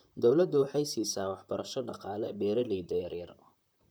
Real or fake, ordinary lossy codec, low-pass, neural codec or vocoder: fake; none; none; vocoder, 44.1 kHz, 128 mel bands, Pupu-Vocoder